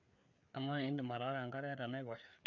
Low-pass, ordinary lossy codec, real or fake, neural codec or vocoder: 7.2 kHz; none; fake; codec, 16 kHz, 4 kbps, FreqCodec, larger model